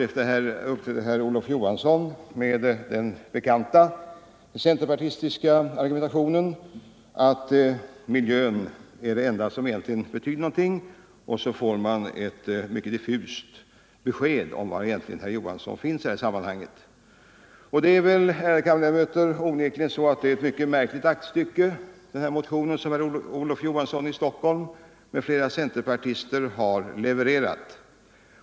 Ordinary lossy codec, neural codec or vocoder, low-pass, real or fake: none; none; none; real